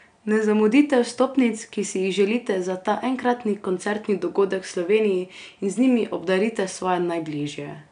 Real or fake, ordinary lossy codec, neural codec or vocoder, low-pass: real; none; none; 9.9 kHz